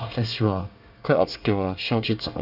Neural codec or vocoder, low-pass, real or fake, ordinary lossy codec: codec, 24 kHz, 1 kbps, SNAC; 5.4 kHz; fake; none